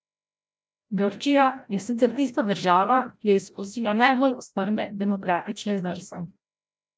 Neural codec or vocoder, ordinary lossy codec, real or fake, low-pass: codec, 16 kHz, 0.5 kbps, FreqCodec, larger model; none; fake; none